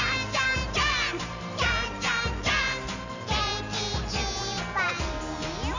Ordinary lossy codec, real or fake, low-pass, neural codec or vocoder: none; real; 7.2 kHz; none